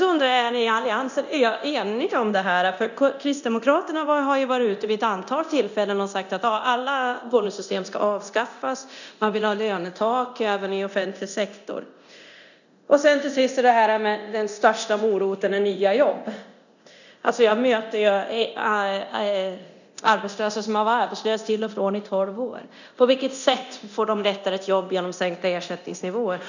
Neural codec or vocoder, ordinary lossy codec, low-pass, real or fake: codec, 24 kHz, 0.9 kbps, DualCodec; none; 7.2 kHz; fake